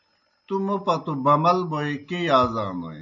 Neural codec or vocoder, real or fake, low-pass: none; real; 7.2 kHz